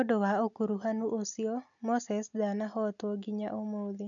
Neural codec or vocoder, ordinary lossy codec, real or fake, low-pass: none; none; real; 7.2 kHz